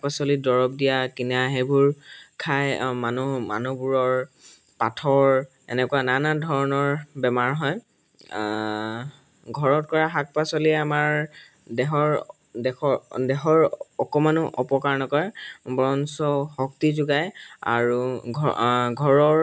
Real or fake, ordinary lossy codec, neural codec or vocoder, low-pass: real; none; none; none